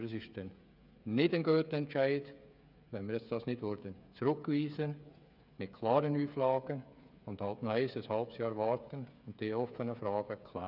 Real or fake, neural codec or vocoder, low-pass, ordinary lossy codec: fake; codec, 16 kHz, 8 kbps, FreqCodec, smaller model; 5.4 kHz; none